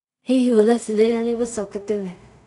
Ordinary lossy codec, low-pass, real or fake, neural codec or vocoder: none; 10.8 kHz; fake; codec, 16 kHz in and 24 kHz out, 0.4 kbps, LongCat-Audio-Codec, two codebook decoder